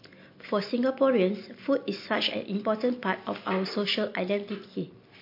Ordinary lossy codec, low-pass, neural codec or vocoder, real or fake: MP3, 32 kbps; 5.4 kHz; none; real